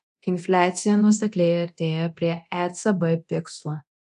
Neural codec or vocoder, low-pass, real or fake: codec, 24 kHz, 0.9 kbps, DualCodec; 10.8 kHz; fake